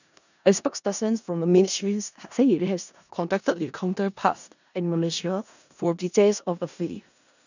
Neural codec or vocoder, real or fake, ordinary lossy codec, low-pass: codec, 16 kHz in and 24 kHz out, 0.4 kbps, LongCat-Audio-Codec, four codebook decoder; fake; none; 7.2 kHz